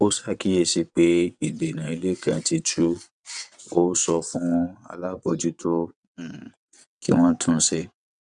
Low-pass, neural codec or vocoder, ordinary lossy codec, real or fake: 9.9 kHz; vocoder, 22.05 kHz, 80 mel bands, WaveNeXt; none; fake